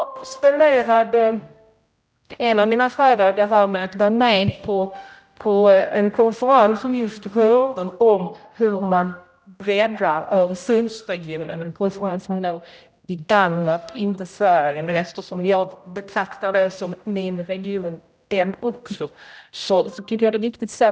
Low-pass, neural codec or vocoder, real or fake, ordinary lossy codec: none; codec, 16 kHz, 0.5 kbps, X-Codec, HuBERT features, trained on general audio; fake; none